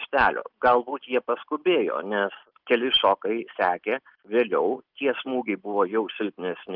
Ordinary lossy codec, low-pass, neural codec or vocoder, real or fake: Opus, 24 kbps; 5.4 kHz; none; real